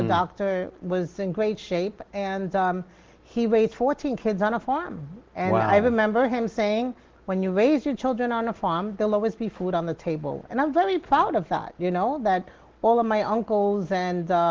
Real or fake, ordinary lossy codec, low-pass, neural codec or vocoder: real; Opus, 16 kbps; 7.2 kHz; none